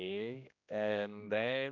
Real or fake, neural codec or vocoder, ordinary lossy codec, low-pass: fake; codec, 16 kHz, 1 kbps, X-Codec, HuBERT features, trained on general audio; none; 7.2 kHz